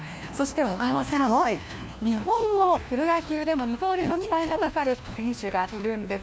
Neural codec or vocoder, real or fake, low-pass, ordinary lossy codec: codec, 16 kHz, 1 kbps, FunCodec, trained on LibriTTS, 50 frames a second; fake; none; none